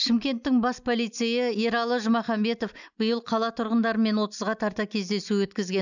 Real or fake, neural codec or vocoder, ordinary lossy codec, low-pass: real; none; none; 7.2 kHz